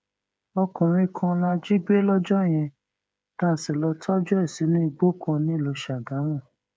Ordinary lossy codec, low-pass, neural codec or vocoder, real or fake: none; none; codec, 16 kHz, 8 kbps, FreqCodec, smaller model; fake